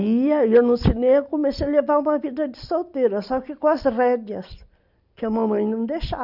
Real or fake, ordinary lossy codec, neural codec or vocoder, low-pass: real; none; none; 5.4 kHz